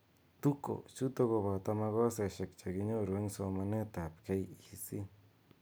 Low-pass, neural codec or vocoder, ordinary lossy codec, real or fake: none; none; none; real